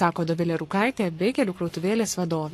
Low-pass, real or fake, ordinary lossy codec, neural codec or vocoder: 14.4 kHz; fake; AAC, 48 kbps; codec, 44.1 kHz, 7.8 kbps, Pupu-Codec